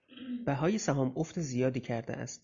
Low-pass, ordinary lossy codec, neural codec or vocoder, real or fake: 7.2 kHz; Opus, 64 kbps; none; real